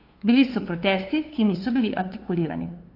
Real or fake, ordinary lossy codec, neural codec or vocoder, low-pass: fake; AAC, 32 kbps; codec, 16 kHz, 2 kbps, FunCodec, trained on LibriTTS, 25 frames a second; 5.4 kHz